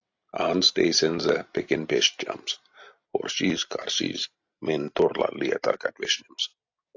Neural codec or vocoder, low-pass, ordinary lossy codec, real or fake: none; 7.2 kHz; AAC, 48 kbps; real